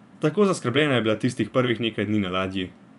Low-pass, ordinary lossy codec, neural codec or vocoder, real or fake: 10.8 kHz; none; vocoder, 24 kHz, 100 mel bands, Vocos; fake